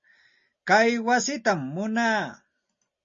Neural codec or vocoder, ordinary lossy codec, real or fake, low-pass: none; MP3, 32 kbps; real; 7.2 kHz